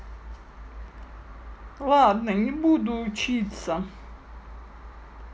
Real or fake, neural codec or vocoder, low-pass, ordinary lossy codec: real; none; none; none